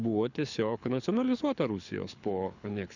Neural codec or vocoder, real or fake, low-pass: codec, 24 kHz, 6 kbps, HILCodec; fake; 7.2 kHz